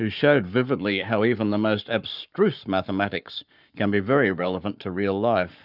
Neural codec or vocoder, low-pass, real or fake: codec, 44.1 kHz, 7.8 kbps, Pupu-Codec; 5.4 kHz; fake